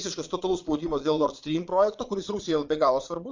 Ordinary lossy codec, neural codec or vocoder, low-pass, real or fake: MP3, 64 kbps; vocoder, 22.05 kHz, 80 mel bands, Vocos; 7.2 kHz; fake